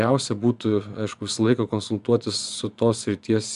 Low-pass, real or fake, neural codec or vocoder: 10.8 kHz; fake; vocoder, 24 kHz, 100 mel bands, Vocos